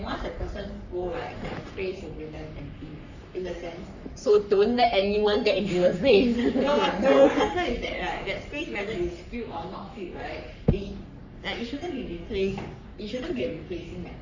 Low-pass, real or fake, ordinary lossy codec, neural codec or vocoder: 7.2 kHz; fake; none; codec, 44.1 kHz, 3.4 kbps, Pupu-Codec